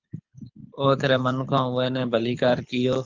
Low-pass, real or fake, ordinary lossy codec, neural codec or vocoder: 7.2 kHz; fake; Opus, 32 kbps; codec, 24 kHz, 6 kbps, HILCodec